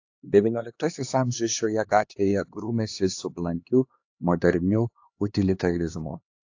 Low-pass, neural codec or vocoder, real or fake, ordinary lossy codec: 7.2 kHz; codec, 16 kHz, 2 kbps, X-Codec, HuBERT features, trained on LibriSpeech; fake; AAC, 48 kbps